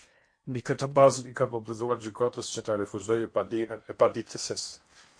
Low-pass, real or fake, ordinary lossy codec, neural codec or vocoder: 9.9 kHz; fake; MP3, 48 kbps; codec, 16 kHz in and 24 kHz out, 0.8 kbps, FocalCodec, streaming, 65536 codes